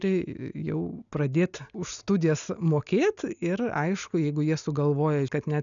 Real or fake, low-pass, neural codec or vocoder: real; 7.2 kHz; none